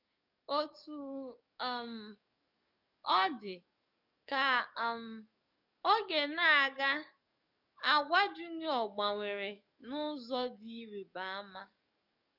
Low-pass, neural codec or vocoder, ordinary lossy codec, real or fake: 5.4 kHz; codec, 44.1 kHz, 7.8 kbps, DAC; none; fake